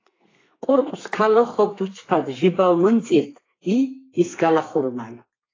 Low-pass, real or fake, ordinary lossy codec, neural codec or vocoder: 7.2 kHz; fake; AAC, 32 kbps; codec, 32 kHz, 1.9 kbps, SNAC